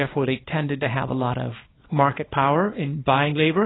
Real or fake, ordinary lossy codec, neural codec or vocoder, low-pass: fake; AAC, 16 kbps; codec, 24 kHz, 0.9 kbps, WavTokenizer, small release; 7.2 kHz